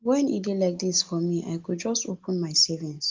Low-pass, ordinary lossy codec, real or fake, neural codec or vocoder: 7.2 kHz; Opus, 24 kbps; real; none